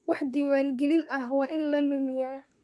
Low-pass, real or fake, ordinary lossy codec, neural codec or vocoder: none; fake; none; codec, 24 kHz, 1 kbps, SNAC